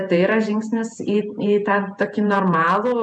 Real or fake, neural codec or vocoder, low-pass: fake; vocoder, 48 kHz, 128 mel bands, Vocos; 9.9 kHz